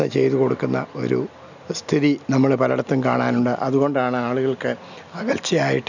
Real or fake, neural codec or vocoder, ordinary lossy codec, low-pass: real; none; none; 7.2 kHz